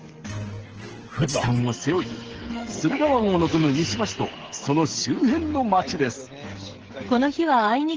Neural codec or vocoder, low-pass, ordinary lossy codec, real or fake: codec, 16 kHz, 8 kbps, FreqCodec, smaller model; 7.2 kHz; Opus, 16 kbps; fake